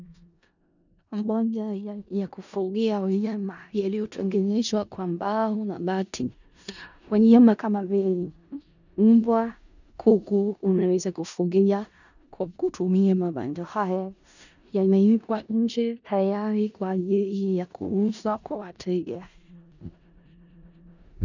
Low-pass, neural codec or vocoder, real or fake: 7.2 kHz; codec, 16 kHz in and 24 kHz out, 0.4 kbps, LongCat-Audio-Codec, four codebook decoder; fake